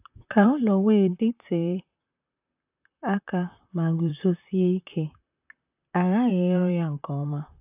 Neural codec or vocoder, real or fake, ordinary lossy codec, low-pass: vocoder, 44.1 kHz, 128 mel bands, Pupu-Vocoder; fake; AAC, 32 kbps; 3.6 kHz